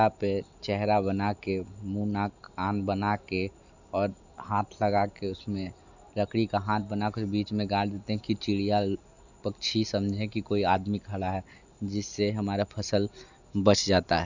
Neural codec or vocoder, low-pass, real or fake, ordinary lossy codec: none; 7.2 kHz; real; none